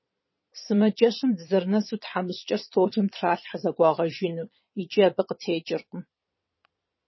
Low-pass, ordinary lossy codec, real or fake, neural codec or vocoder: 7.2 kHz; MP3, 24 kbps; fake; vocoder, 22.05 kHz, 80 mel bands, Vocos